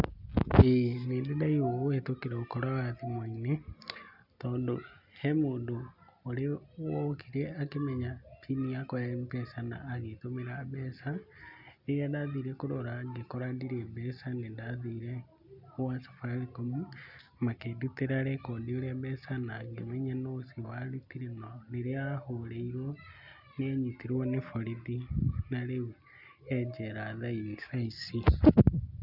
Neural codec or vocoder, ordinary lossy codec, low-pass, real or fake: none; none; 5.4 kHz; real